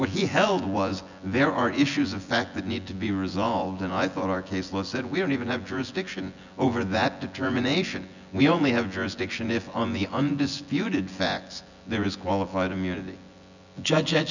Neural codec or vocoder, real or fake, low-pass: vocoder, 24 kHz, 100 mel bands, Vocos; fake; 7.2 kHz